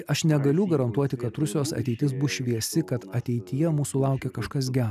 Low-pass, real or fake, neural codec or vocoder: 14.4 kHz; real; none